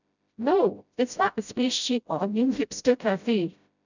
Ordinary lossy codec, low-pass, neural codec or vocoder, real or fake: none; 7.2 kHz; codec, 16 kHz, 0.5 kbps, FreqCodec, smaller model; fake